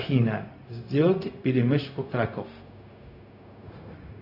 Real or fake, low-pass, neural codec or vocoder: fake; 5.4 kHz; codec, 16 kHz, 0.4 kbps, LongCat-Audio-Codec